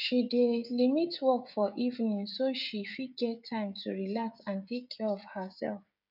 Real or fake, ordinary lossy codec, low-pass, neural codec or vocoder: fake; none; 5.4 kHz; codec, 16 kHz, 16 kbps, FreqCodec, smaller model